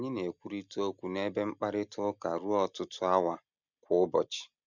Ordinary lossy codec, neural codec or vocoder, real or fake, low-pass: none; none; real; 7.2 kHz